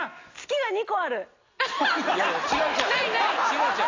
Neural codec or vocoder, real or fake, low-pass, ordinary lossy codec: none; real; 7.2 kHz; AAC, 32 kbps